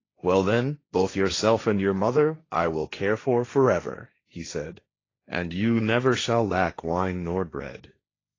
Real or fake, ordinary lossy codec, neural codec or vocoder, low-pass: fake; AAC, 32 kbps; codec, 16 kHz, 1.1 kbps, Voila-Tokenizer; 7.2 kHz